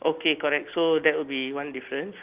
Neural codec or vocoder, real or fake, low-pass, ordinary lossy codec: none; real; 3.6 kHz; Opus, 24 kbps